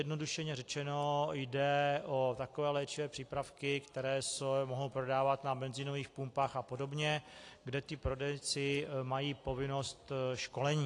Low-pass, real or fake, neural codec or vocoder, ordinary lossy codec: 10.8 kHz; real; none; AAC, 48 kbps